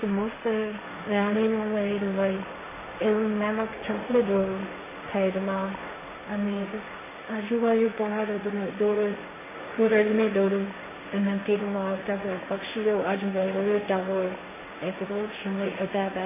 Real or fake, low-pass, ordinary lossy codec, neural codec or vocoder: fake; 3.6 kHz; MP3, 16 kbps; codec, 16 kHz, 1.1 kbps, Voila-Tokenizer